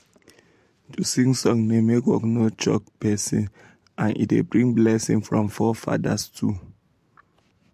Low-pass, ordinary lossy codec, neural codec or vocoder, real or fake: 14.4 kHz; MP3, 64 kbps; vocoder, 44.1 kHz, 128 mel bands every 512 samples, BigVGAN v2; fake